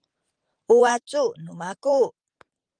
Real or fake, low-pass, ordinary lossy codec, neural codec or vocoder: fake; 9.9 kHz; Opus, 24 kbps; vocoder, 44.1 kHz, 128 mel bands every 512 samples, BigVGAN v2